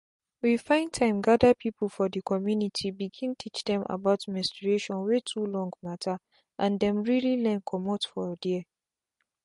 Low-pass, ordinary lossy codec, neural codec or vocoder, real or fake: 14.4 kHz; MP3, 48 kbps; none; real